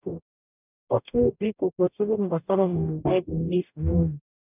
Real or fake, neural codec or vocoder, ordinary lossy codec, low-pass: fake; codec, 44.1 kHz, 0.9 kbps, DAC; none; 3.6 kHz